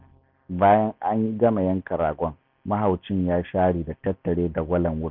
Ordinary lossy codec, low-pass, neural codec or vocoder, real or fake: none; 5.4 kHz; none; real